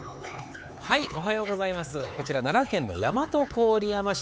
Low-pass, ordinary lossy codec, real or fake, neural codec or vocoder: none; none; fake; codec, 16 kHz, 4 kbps, X-Codec, HuBERT features, trained on LibriSpeech